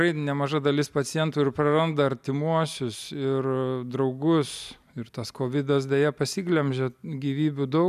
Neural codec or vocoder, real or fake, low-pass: none; real; 14.4 kHz